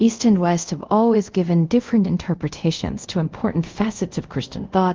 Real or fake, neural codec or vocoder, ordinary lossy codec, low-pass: fake; codec, 24 kHz, 0.5 kbps, DualCodec; Opus, 32 kbps; 7.2 kHz